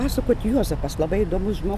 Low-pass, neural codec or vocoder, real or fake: 14.4 kHz; none; real